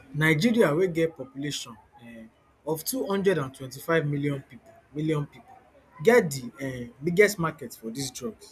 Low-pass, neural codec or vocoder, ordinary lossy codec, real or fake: 14.4 kHz; none; none; real